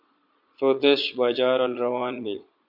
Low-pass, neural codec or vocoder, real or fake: 5.4 kHz; vocoder, 22.05 kHz, 80 mel bands, Vocos; fake